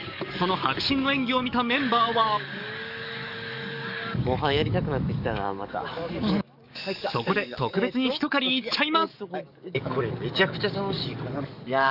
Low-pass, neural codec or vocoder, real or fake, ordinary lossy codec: 5.4 kHz; codec, 44.1 kHz, 7.8 kbps, DAC; fake; none